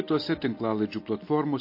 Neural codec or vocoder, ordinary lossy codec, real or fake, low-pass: none; MP3, 32 kbps; real; 5.4 kHz